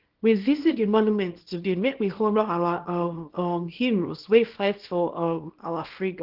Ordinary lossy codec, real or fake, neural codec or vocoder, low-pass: Opus, 16 kbps; fake; codec, 24 kHz, 0.9 kbps, WavTokenizer, small release; 5.4 kHz